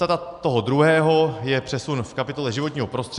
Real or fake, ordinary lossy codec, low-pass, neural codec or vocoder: real; AAC, 96 kbps; 10.8 kHz; none